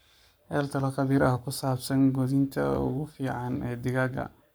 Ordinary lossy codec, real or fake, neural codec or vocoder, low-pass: none; fake; codec, 44.1 kHz, 7.8 kbps, Pupu-Codec; none